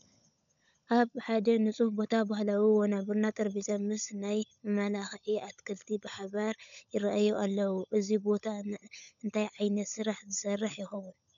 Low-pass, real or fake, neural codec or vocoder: 7.2 kHz; fake; codec, 16 kHz, 16 kbps, FunCodec, trained on LibriTTS, 50 frames a second